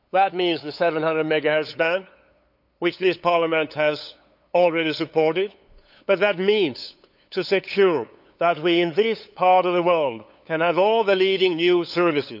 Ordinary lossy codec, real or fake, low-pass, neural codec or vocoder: none; fake; 5.4 kHz; codec, 16 kHz, 8 kbps, FunCodec, trained on LibriTTS, 25 frames a second